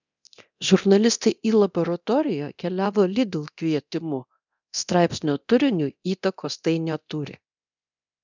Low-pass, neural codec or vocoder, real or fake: 7.2 kHz; codec, 24 kHz, 0.9 kbps, DualCodec; fake